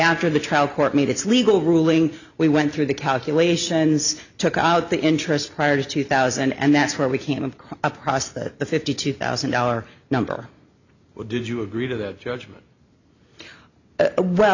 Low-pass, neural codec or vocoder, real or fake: 7.2 kHz; none; real